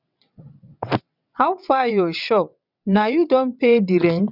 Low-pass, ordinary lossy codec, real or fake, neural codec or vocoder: 5.4 kHz; none; fake; vocoder, 22.05 kHz, 80 mel bands, WaveNeXt